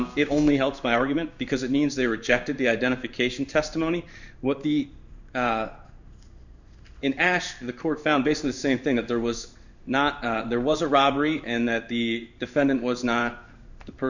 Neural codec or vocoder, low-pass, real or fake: codec, 16 kHz in and 24 kHz out, 1 kbps, XY-Tokenizer; 7.2 kHz; fake